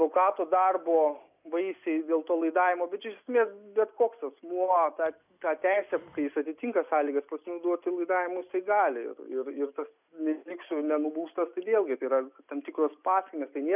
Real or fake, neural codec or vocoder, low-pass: real; none; 3.6 kHz